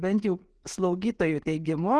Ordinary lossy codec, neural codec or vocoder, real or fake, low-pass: Opus, 16 kbps; none; real; 10.8 kHz